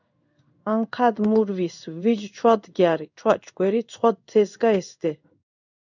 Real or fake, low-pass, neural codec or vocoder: fake; 7.2 kHz; codec, 16 kHz in and 24 kHz out, 1 kbps, XY-Tokenizer